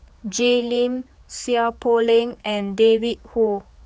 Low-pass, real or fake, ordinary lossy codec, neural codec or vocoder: none; fake; none; codec, 16 kHz, 4 kbps, X-Codec, HuBERT features, trained on general audio